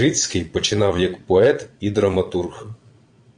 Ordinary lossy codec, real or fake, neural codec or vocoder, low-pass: AAC, 64 kbps; fake; vocoder, 22.05 kHz, 80 mel bands, Vocos; 9.9 kHz